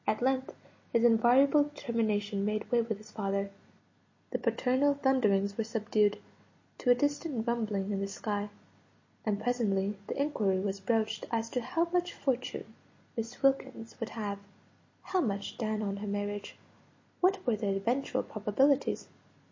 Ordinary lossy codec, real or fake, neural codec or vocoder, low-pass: MP3, 32 kbps; real; none; 7.2 kHz